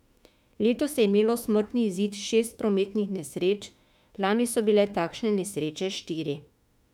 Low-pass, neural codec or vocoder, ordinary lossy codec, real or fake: 19.8 kHz; autoencoder, 48 kHz, 32 numbers a frame, DAC-VAE, trained on Japanese speech; none; fake